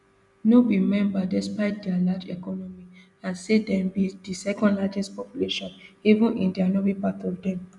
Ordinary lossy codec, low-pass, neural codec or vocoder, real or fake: none; 10.8 kHz; none; real